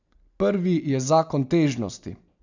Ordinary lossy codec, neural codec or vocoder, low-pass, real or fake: none; none; 7.2 kHz; real